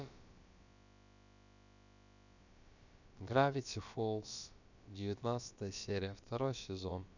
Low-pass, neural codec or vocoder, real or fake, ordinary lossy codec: 7.2 kHz; codec, 16 kHz, about 1 kbps, DyCAST, with the encoder's durations; fake; none